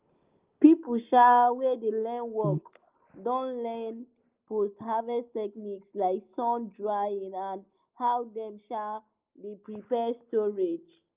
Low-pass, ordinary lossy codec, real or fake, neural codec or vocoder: 3.6 kHz; Opus, 32 kbps; real; none